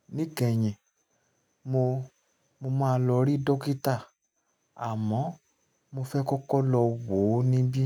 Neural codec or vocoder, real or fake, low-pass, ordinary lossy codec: none; real; 19.8 kHz; none